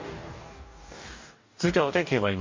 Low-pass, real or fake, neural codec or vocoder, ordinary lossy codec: 7.2 kHz; fake; codec, 44.1 kHz, 2.6 kbps, DAC; MP3, 48 kbps